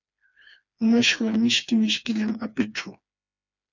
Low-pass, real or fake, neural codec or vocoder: 7.2 kHz; fake; codec, 16 kHz, 2 kbps, FreqCodec, smaller model